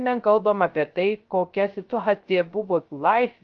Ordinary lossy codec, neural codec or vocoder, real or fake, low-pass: Opus, 32 kbps; codec, 16 kHz, 0.3 kbps, FocalCodec; fake; 7.2 kHz